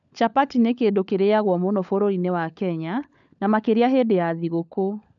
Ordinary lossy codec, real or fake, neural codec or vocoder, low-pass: none; fake; codec, 16 kHz, 16 kbps, FunCodec, trained on LibriTTS, 50 frames a second; 7.2 kHz